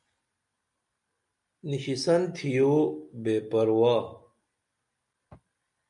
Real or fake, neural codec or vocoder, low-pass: real; none; 10.8 kHz